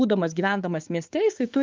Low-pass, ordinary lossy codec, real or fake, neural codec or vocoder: 7.2 kHz; Opus, 32 kbps; fake; codec, 16 kHz, 6 kbps, DAC